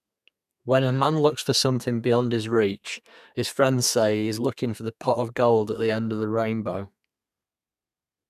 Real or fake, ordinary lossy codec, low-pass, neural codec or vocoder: fake; none; 14.4 kHz; codec, 32 kHz, 1.9 kbps, SNAC